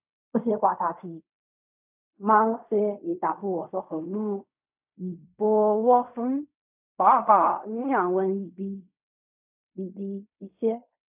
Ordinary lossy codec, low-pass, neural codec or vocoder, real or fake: none; 3.6 kHz; codec, 16 kHz in and 24 kHz out, 0.4 kbps, LongCat-Audio-Codec, fine tuned four codebook decoder; fake